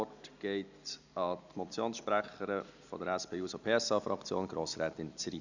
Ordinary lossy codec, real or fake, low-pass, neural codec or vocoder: none; real; 7.2 kHz; none